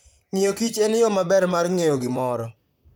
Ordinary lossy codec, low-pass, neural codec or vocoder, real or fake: none; none; vocoder, 44.1 kHz, 128 mel bands, Pupu-Vocoder; fake